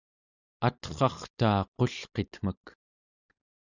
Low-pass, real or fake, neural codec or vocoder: 7.2 kHz; real; none